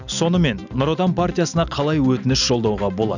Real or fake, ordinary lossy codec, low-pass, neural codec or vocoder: real; none; 7.2 kHz; none